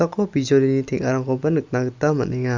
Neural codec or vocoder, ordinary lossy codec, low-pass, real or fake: none; Opus, 64 kbps; 7.2 kHz; real